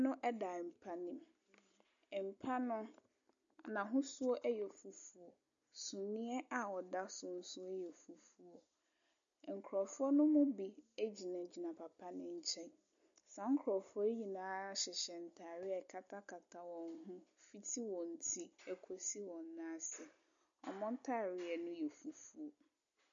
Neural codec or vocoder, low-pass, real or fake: none; 7.2 kHz; real